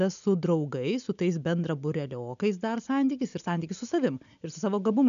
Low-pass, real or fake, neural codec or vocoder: 7.2 kHz; real; none